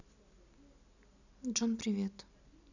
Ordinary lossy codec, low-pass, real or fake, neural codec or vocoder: none; 7.2 kHz; real; none